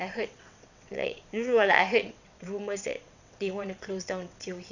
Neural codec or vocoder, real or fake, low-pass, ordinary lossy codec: vocoder, 22.05 kHz, 80 mel bands, WaveNeXt; fake; 7.2 kHz; none